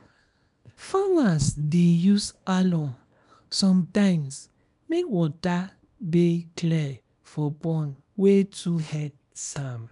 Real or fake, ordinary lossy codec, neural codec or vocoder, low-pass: fake; none; codec, 24 kHz, 0.9 kbps, WavTokenizer, small release; 10.8 kHz